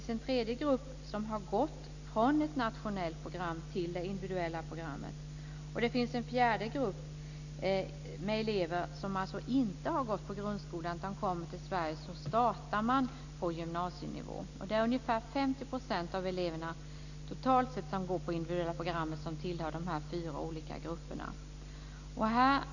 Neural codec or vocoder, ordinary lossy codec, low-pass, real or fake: none; none; 7.2 kHz; real